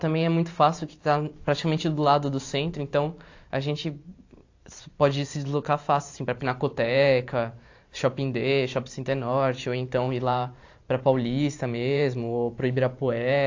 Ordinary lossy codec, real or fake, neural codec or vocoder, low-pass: AAC, 48 kbps; fake; vocoder, 44.1 kHz, 128 mel bands every 512 samples, BigVGAN v2; 7.2 kHz